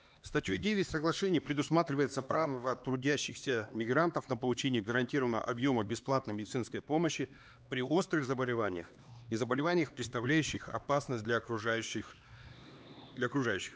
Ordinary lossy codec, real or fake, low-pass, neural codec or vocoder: none; fake; none; codec, 16 kHz, 2 kbps, X-Codec, HuBERT features, trained on LibriSpeech